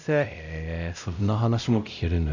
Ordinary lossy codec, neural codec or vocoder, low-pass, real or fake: none; codec, 16 kHz, 0.5 kbps, X-Codec, WavLM features, trained on Multilingual LibriSpeech; 7.2 kHz; fake